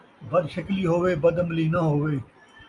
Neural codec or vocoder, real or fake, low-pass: none; real; 10.8 kHz